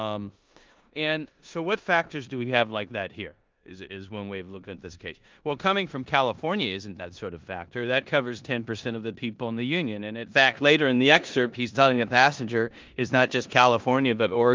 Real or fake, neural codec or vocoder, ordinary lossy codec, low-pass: fake; codec, 16 kHz in and 24 kHz out, 0.9 kbps, LongCat-Audio-Codec, four codebook decoder; Opus, 24 kbps; 7.2 kHz